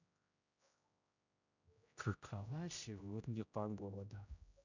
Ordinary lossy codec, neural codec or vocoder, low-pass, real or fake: none; codec, 16 kHz, 0.5 kbps, X-Codec, HuBERT features, trained on general audio; 7.2 kHz; fake